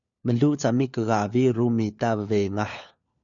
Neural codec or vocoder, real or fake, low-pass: codec, 16 kHz, 4 kbps, FunCodec, trained on LibriTTS, 50 frames a second; fake; 7.2 kHz